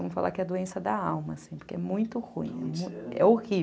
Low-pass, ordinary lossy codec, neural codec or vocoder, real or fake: none; none; none; real